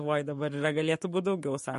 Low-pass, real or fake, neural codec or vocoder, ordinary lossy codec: 14.4 kHz; fake; codec, 44.1 kHz, 7.8 kbps, Pupu-Codec; MP3, 48 kbps